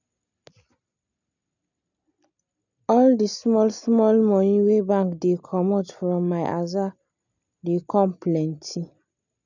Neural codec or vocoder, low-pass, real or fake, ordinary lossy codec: none; 7.2 kHz; real; none